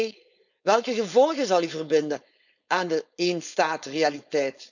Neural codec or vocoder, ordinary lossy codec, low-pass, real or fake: codec, 16 kHz, 4.8 kbps, FACodec; none; 7.2 kHz; fake